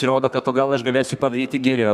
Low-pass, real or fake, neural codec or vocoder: 14.4 kHz; fake; codec, 32 kHz, 1.9 kbps, SNAC